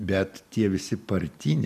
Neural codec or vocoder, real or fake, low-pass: none; real; 14.4 kHz